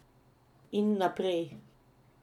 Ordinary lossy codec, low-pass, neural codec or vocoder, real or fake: none; 19.8 kHz; none; real